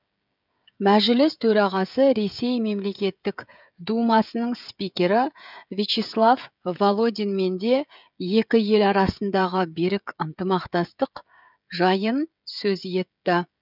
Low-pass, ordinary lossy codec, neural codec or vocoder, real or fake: 5.4 kHz; none; codec, 16 kHz, 16 kbps, FreqCodec, smaller model; fake